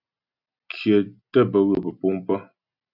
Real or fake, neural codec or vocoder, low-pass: real; none; 5.4 kHz